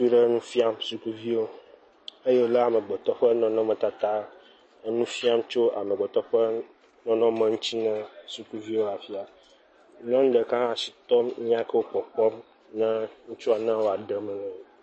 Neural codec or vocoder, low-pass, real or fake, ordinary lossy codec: none; 9.9 kHz; real; MP3, 32 kbps